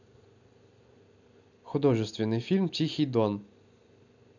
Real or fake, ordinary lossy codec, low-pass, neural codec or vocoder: real; none; 7.2 kHz; none